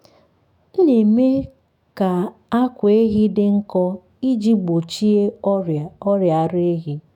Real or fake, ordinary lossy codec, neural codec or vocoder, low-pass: fake; none; autoencoder, 48 kHz, 128 numbers a frame, DAC-VAE, trained on Japanese speech; 19.8 kHz